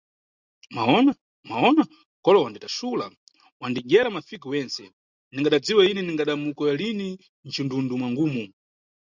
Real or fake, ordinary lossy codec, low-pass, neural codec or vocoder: real; Opus, 64 kbps; 7.2 kHz; none